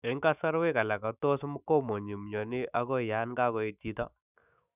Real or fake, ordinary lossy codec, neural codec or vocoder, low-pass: fake; none; autoencoder, 48 kHz, 128 numbers a frame, DAC-VAE, trained on Japanese speech; 3.6 kHz